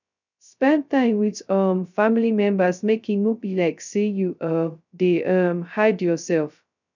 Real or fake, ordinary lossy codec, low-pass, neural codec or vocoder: fake; none; 7.2 kHz; codec, 16 kHz, 0.2 kbps, FocalCodec